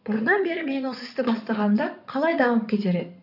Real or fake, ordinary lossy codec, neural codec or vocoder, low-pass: fake; none; codec, 16 kHz in and 24 kHz out, 2.2 kbps, FireRedTTS-2 codec; 5.4 kHz